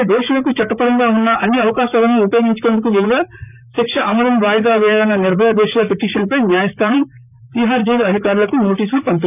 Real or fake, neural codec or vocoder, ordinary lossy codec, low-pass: fake; vocoder, 44.1 kHz, 128 mel bands, Pupu-Vocoder; none; 3.6 kHz